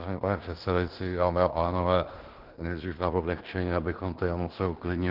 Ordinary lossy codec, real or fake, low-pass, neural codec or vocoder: Opus, 16 kbps; fake; 5.4 kHz; codec, 16 kHz in and 24 kHz out, 0.9 kbps, LongCat-Audio-Codec, fine tuned four codebook decoder